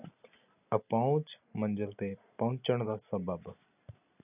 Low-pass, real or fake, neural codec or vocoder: 3.6 kHz; real; none